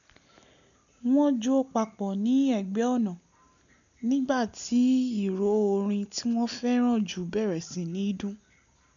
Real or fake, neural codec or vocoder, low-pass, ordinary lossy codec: real; none; 7.2 kHz; none